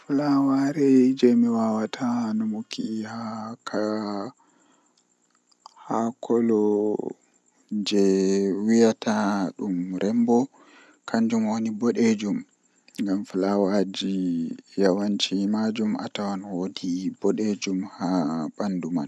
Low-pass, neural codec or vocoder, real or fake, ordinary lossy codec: none; none; real; none